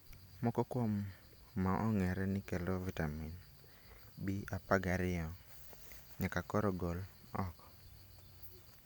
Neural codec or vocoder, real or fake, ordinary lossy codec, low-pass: none; real; none; none